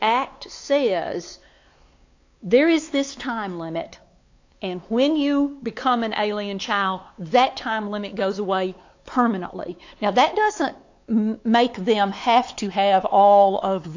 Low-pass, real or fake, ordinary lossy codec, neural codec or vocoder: 7.2 kHz; fake; AAC, 48 kbps; codec, 16 kHz, 4 kbps, X-Codec, WavLM features, trained on Multilingual LibriSpeech